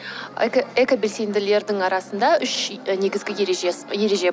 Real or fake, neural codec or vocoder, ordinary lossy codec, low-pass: real; none; none; none